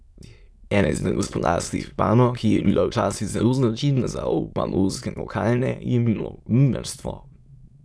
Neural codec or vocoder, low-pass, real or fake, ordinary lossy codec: autoencoder, 22.05 kHz, a latent of 192 numbers a frame, VITS, trained on many speakers; none; fake; none